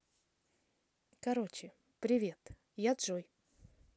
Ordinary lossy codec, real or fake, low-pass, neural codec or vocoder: none; real; none; none